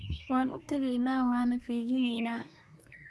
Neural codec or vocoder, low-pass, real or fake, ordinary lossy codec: codec, 24 kHz, 1 kbps, SNAC; none; fake; none